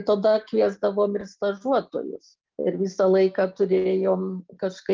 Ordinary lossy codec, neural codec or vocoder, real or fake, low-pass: Opus, 24 kbps; none; real; 7.2 kHz